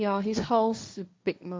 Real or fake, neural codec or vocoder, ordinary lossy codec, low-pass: fake; codec, 16 kHz, 1.1 kbps, Voila-Tokenizer; none; none